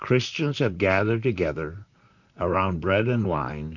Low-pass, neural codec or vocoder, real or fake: 7.2 kHz; vocoder, 44.1 kHz, 128 mel bands, Pupu-Vocoder; fake